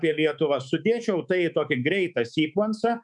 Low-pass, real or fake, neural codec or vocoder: 10.8 kHz; fake; codec, 24 kHz, 3.1 kbps, DualCodec